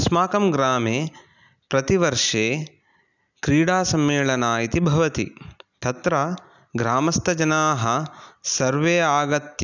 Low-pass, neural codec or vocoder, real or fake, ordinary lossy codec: 7.2 kHz; none; real; none